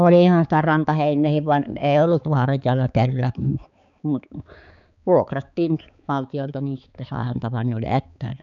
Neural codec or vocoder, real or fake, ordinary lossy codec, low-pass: codec, 16 kHz, 4 kbps, X-Codec, HuBERT features, trained on balanced general audio; fake; none; 7.2 kHz